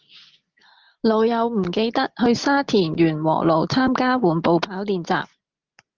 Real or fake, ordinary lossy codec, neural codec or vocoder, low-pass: real; Opus, 16 kbps; none; 7.2 kHz